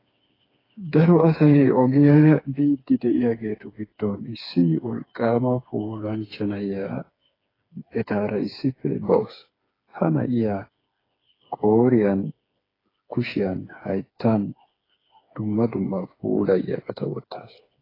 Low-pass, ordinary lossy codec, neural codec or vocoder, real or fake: 5.4 kHz; AAC, 24 kbps; codec, 16 kHz, 4 kbps, FreqCodec, smaller model; fake